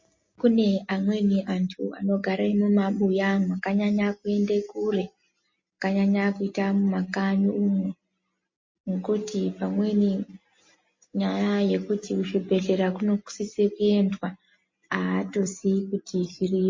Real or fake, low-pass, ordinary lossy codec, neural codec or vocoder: real; 7.2 kHz; MP3, 32 kbps; none